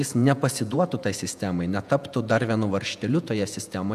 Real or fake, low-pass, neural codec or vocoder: fake; 14.4 kHz; vocoder, 48 kHz, 128 mel bands, Vocos